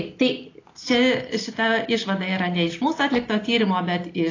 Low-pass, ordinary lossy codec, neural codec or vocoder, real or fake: 7.2 kHz; AAC, 32 kbps; none; real